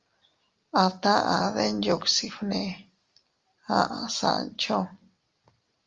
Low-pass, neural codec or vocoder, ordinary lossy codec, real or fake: 7.2 kHz; none; Opus, 32 kbps; real